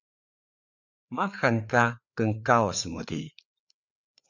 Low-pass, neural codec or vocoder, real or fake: 7.2 kHz; codec, 16 kHz, 4 kbps, FreqCodec, larger model; fake